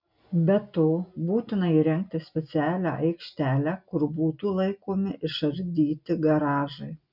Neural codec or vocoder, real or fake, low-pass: none; real; 5.4 kHz